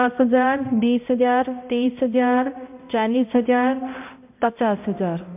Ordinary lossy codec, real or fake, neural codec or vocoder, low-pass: MP3, 32 kbps; fake; codec, 16 kHz, 0.5 kbps, X-Codec, HuBERT features, trained on balanced general audio; 3.6 kHz